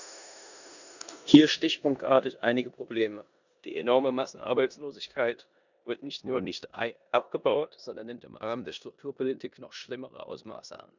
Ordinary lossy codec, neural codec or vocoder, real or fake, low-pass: none; codec, 16 kHz in and 24 kHz out, 0.9 kbps, LongCat-Audio-Codec, four codebook decoder; fake; 7.2 kHz